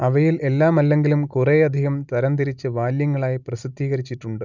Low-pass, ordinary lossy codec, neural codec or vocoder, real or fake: 7.2 kHz; none; none; real